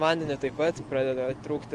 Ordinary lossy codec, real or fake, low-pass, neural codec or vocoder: Opus, 24 kbps; real; 10.8 kHz; none